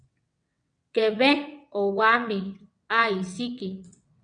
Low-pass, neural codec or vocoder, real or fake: 9.9 kHz; vocoder, 22.05 kHz, 80 mel bands, WaveNeXt; fake